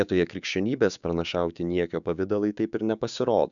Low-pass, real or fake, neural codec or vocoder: 7.2 kHz; fake; codec, 16 kHz, 6 kbps, DAC